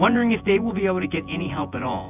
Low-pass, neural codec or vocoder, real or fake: 3.6 kHz; vocoder, 24 kHz, 100 mel bands, Vocos; fake